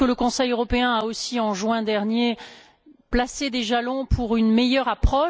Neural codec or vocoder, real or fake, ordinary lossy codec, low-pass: none; real; none; none